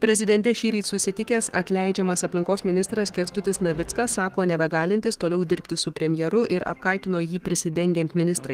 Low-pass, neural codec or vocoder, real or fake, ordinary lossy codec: 14.4 kHz; codec, 32 kHz, 1.9 kbps, SNAC; fake; Opus, 24 kbps